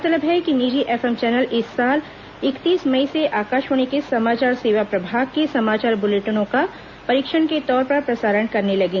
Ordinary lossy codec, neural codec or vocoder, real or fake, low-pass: Opus, 64 kbps; none; real; 7.2 kHz